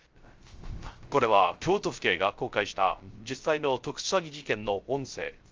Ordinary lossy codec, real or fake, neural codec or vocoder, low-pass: Opus, 32 kbps; fake; codec, 16 kHz, 0.3 kbps, FocalCodec; 7.2 kHz